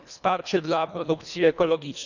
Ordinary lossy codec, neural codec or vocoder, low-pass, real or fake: none; codec, 24 kHz, 1.5 kbps, HILCodec; 7.2 kHz; fake